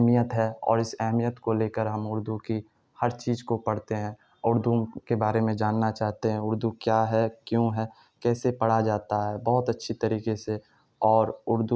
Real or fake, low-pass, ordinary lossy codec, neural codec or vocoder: real; none; none; none